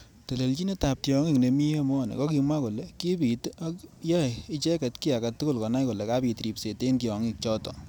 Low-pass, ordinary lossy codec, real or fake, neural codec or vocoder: none; none; real; none